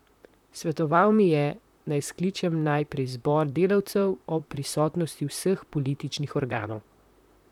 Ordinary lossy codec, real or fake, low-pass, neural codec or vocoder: none; fake; 19.8 kHz; vocoder, 44.1 kHz, 128 mel bands, Pupu-Vocoder